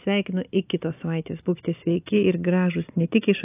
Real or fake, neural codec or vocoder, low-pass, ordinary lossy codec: real; none; 3.6 kHz; AAC, 24 kbps